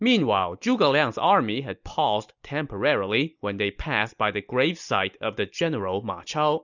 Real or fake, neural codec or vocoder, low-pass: real; none; 7.2 kHz